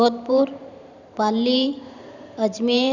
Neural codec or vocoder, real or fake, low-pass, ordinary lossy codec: vocoder, 44.1 kHz, 128 mel bands every 512 samples, BigVGAN v2; fake; 7.2 kHz; none